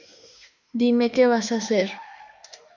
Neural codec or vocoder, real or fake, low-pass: autoencoder, 48 kHz, 32 numbers a frame, DAC-VAE, trained on Japanese speech; fake; 7.2 kHz